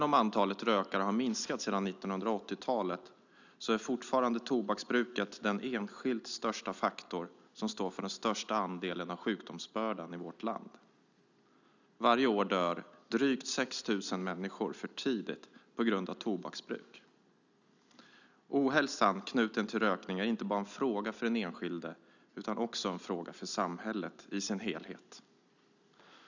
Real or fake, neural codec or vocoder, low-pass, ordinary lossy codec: real; none; 7.2 kHz; none